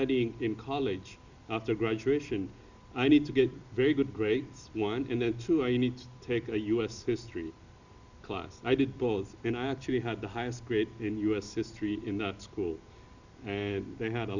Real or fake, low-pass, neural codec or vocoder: real; 7.2 kHz; none